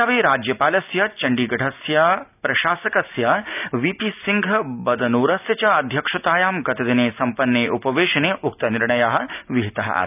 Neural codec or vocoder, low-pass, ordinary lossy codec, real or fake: none; 3.6 kHz; none; real